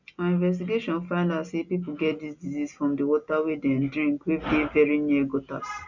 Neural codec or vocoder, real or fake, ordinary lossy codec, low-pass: none; real; none; 7.2 kHz